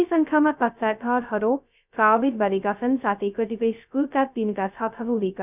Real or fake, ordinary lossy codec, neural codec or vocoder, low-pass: fake; none; codec, 16 kHz, 0.2 kbps, FocalCodec; 3.6 kHz